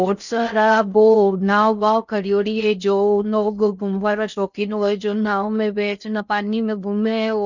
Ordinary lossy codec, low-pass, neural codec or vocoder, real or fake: none; 7.2 kHz; codec, 16 kHz in and 24 kHz out, 0.6 kbps, FocalCodec, streaming, 4096 codes; fake